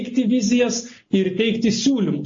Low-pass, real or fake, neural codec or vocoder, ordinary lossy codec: 7.2 kHz; real; none; MP3, 32 kbps